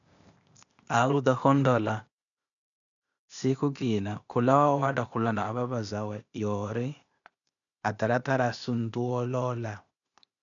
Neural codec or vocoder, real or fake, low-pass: codec, 16 kHz, 0.8 kbps, ZipCodec; fake; 7.2 kHz